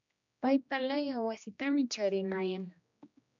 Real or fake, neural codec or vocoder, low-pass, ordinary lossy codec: fake; codec, 16 kHz, 1 kbps, X-Codec, HuBERT features, trained on general audio; 7.2 kHz; MP3, 64 kbps